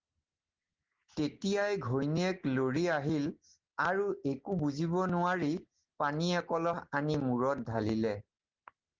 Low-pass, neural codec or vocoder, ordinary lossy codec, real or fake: 7.2 kHz; none; Opus, 16 kbps; real